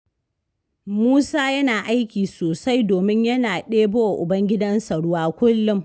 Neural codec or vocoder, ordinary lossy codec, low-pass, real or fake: none; none; none; real